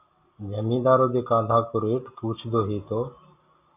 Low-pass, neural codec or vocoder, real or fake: 3.6 kHz; none; real